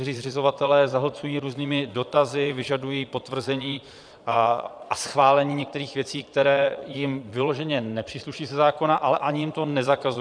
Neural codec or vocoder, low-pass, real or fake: vocoder, 22.05 kHz, 80 mel bands, Vocos; 9.9 kHz; fake